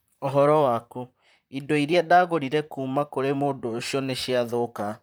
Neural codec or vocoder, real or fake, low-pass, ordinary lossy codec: vocoder, 44.1 kHz, 128 mel bands, Pupu-Vocoder; fake; none; none